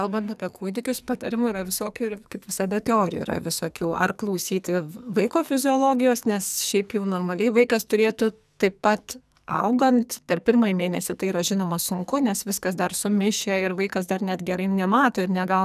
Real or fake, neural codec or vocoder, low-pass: fake; codec, 44.1 kHz, 2.6 kbps, SNAC; 14.4 kHz